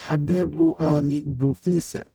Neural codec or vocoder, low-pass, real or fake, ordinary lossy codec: codec, 44.1 kHz, 0.9 kbps, DAC; none; fake; none